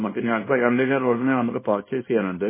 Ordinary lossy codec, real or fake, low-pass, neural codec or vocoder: MP3, 16 kbps; fake; 3.6 kHz; codec, 24 kHz, 0.9 kbps, WavTokenizer, small release